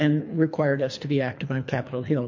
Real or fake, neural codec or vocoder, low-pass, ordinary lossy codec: fake; codec, 24 kHz, 3 kbps, HILCodec; 7.2 kHz; MP3, 48 kbps